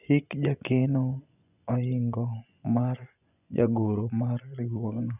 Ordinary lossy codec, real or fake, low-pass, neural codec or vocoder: none; fake; 3.6 kHz; vocoder, 24 kHz, 100 mel bands, Vocos